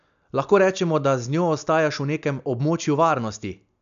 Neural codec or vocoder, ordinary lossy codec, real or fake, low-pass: none; none; real; 7.2 kHz